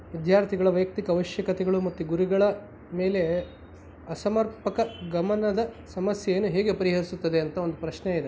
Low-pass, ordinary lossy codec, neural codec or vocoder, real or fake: none; none; none; real